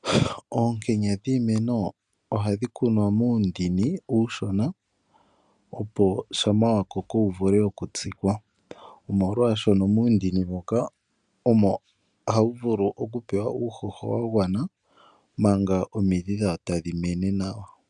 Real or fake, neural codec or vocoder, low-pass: real; none; 9.9 kHz